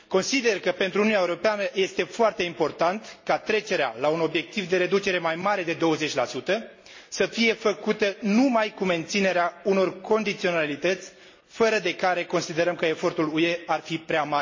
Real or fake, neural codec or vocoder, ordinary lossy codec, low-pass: real; none; MP3, 32 kbps; 7.2 kHz